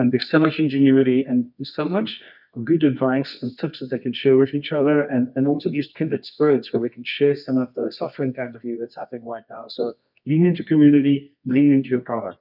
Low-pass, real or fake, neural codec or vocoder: 5.4 kHz; fake; codec, 24 kHz, 0.9 kbps, WavTokenizer, medium music audio release